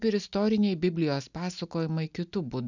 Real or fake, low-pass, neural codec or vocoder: real; 7.2 kHz; none